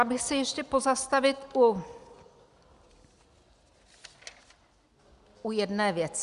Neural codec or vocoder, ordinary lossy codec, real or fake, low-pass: none; Opus, 64 kbps; real; 10.8 kHz